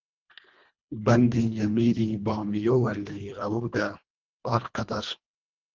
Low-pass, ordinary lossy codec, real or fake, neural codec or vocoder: 7.2 kHz; Opus, 32 kbps; fake; codec, 24 kHz, 1.5 kbps, HILCodec